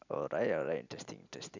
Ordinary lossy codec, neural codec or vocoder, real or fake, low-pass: none; none; real; 7.2 kHz